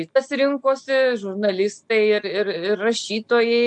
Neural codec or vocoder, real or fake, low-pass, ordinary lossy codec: none; real; 10.8 kHz; MP3, 64 kbps